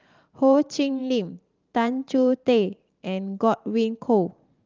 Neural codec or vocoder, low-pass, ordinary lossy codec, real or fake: vocoder, 44.1 kHz, 128 mel bands every 512 samples, BigVGAN v2; 7.2 kHz; Opus, 32 kbps; fake